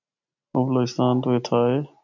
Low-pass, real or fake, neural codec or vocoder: 7.2 kHz; real; none